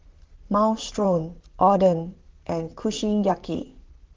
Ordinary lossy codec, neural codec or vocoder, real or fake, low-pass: Opus, 16 kbps; vocoder, 44.1 kHz, 128 mel bands, Pupu-Vocoder; fake; 7.2 kHz